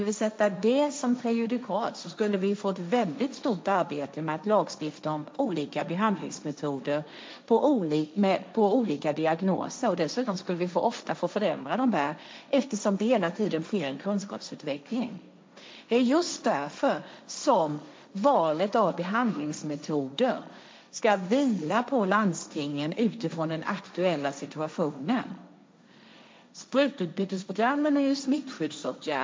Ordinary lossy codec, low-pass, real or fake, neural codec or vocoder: none; none; fake; codec, 16 kHz, 1.1 kbps, Voila-Tokenizer